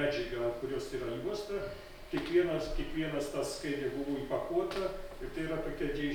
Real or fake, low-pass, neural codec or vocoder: real; 19.8 kHz; none